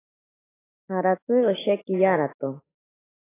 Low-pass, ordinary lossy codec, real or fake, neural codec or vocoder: 3.6 kHz; AAC, 16 kbps; fake; autoencoder, 48 kHz, 128 numbers a frame, DAC-VAE, trained on Japanese speech